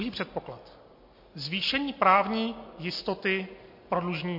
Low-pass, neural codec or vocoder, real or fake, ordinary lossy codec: 5.4 kHz; none; real; MP3, 32 kbps